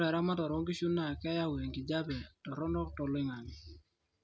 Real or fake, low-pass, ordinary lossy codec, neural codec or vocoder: real; none; none; none